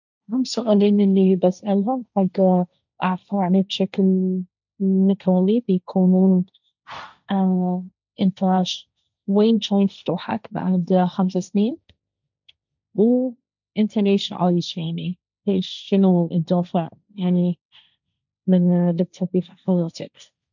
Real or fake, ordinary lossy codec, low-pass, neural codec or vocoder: fake; none; 7.2 kHz; codec, 16 kHz, 1.1 kbps, Voila-Tokenizer